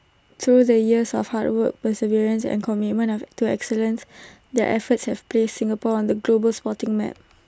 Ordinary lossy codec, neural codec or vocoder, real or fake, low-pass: none; none; real; none